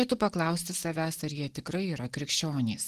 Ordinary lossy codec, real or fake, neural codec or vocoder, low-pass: Opus, 16 kbps; real; none; 14.4 kHz